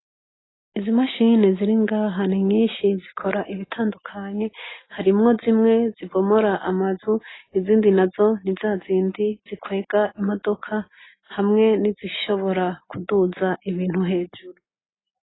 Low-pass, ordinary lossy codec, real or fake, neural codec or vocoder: 7.2 kHz; AAC, 16 kbps; real; none